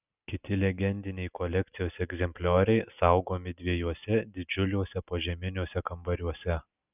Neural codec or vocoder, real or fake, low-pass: none; real; 3.6 kHz